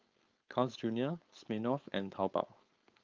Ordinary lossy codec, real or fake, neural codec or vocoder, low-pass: Opus, 24 kbps; fake; codec, 16 kHz, 4.8 kbps, FACodec; 7.2 kHz